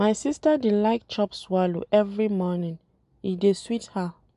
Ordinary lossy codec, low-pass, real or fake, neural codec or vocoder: MP3, 96 kbps; 10.8 kHz; real; none